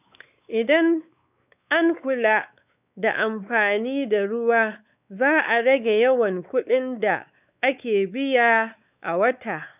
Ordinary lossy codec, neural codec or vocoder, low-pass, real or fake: none; codec, 16 kHz, 4 kbps, X-Codec, WavLM features, trained on Multilingual LibriSpeech; 3.6 kHz; fake